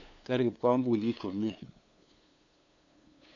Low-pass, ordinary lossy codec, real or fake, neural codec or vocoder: 7.2 kHz; none; fake; codec, 16 kHz, 2 kbps, FunCodec, trained on LibriTTS, 25 frames a second